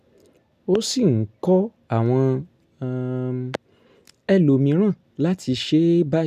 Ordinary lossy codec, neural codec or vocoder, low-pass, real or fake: none; none; 14.4 kHz; real